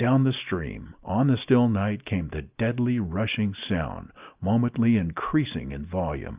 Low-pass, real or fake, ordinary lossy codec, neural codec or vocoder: 3.6 kHz; real; Opus, 24 kbps; none